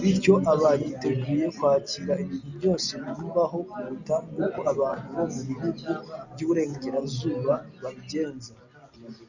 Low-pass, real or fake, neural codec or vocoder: 7.2 kHz; real; none